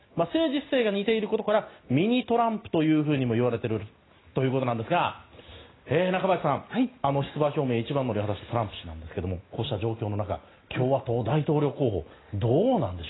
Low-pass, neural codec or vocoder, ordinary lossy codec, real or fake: 7.2 kHz; none; AAC, 16 kbps; real